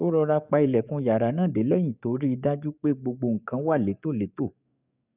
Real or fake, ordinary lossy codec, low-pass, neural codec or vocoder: fake; none; 3.6 kHz; codec, 44.1 kHz, 7.8 kbps, DAC